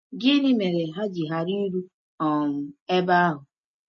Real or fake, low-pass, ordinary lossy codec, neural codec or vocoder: real; 7.2 kHz; MP3, 32 kbps; none